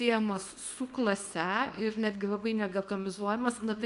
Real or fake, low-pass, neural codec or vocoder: fake; 10.8 kHz; codec, 24 kHz, 0.9 kbps, WavTokenizer, small release